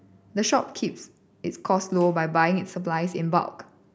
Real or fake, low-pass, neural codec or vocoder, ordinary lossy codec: real; none; none; none